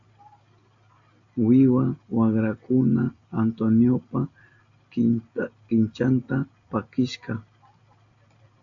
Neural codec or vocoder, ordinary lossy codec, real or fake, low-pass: none; AAC, 64 kbps; real; 7.2 kHz